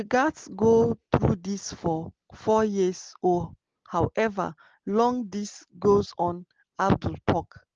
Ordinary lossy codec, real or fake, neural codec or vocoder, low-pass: Opus, 16 kbps; real; none; 7.2 kHz